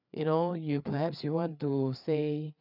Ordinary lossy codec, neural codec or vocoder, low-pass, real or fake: none; codec, 16 kHz, 4 kbps, FreqCodec, larger model; 5.4 kHz; fake